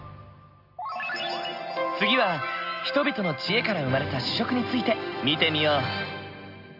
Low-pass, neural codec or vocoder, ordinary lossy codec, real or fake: 5.4 kHz; none; Opus, 64 kbps; real